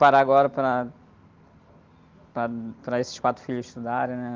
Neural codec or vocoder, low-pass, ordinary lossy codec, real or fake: none; 7.2 kHz; Opus, 32 kbps; real